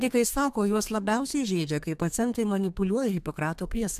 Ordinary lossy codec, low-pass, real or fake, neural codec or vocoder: AAC, 96 kbps; 14.4 kHz; fake; codec, 32 kHz, 1.9 kbps, SNAC